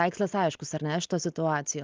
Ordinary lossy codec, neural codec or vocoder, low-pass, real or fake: Opus, 24 kbps; none; 7.2 kHz; real